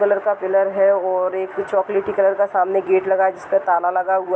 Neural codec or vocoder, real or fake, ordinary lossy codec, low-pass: none; real; none; none